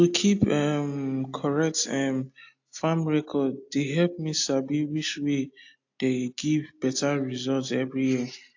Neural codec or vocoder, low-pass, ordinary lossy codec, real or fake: none; 7.2 kHz; AAC, 48 kbps; real